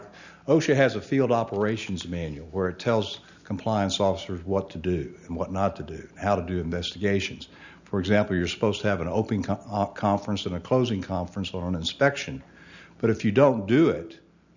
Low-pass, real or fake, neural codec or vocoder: 7.2 kHz; real; none